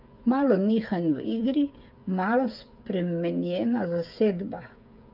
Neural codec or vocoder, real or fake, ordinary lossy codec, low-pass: codec, 16 kHz, 8 kbps, FreqCodec, smaller model; fake; none; 5.4 kHz